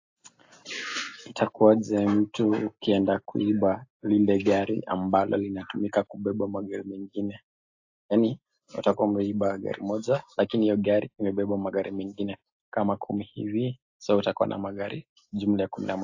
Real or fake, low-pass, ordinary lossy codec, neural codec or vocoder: real; 7.2 kHz; AAC, 48 kbps; none